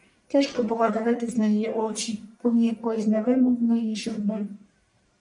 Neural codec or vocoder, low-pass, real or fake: codec, 44.1 kHz, 1.7 kbps, Pupu-Codec; 10.8 kHz; fake